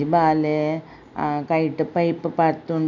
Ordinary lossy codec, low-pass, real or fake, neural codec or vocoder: none; 7.2 kHz; real; none